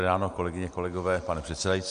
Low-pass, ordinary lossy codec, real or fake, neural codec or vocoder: 9.9 kHz; MP3, 64 kbps; real; none